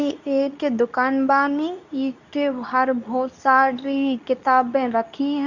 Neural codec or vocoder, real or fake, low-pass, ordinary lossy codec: codec, 24 kHz, 0.9 kbps, WavTokenizer, medium speech release version 2; fake; 7.2 kHz; none